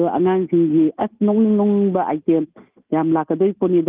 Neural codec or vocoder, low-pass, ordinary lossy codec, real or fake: none; 3.6 kHz; Opus, 32 kbps; real